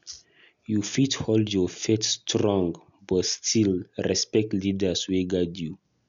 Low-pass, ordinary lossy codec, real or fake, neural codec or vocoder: 7.2 kHz; none; real; none